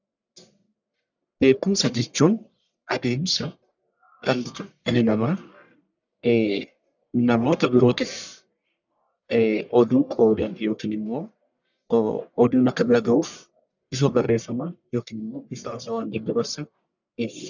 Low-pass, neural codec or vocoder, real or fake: 7.2 kHz; codec, 44.1 kHz, 1.7 kbps, Pupu-Codec; fake